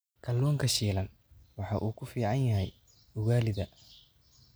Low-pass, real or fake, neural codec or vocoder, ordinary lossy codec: none; real; none; none